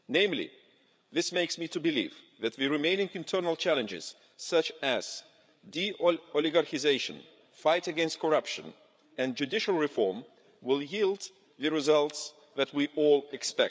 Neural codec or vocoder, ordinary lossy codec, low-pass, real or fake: codec, 16 kHz, 8 kbps, FreqCodec, larger model; none; none; fake